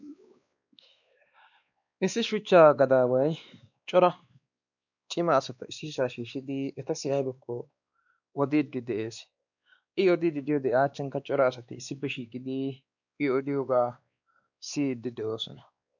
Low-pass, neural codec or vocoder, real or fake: 7.2 kHz; codec, 16 kHz, 2 kbps, X-Codec, WavLM features, trained on Multilingual LibriSpeech; fake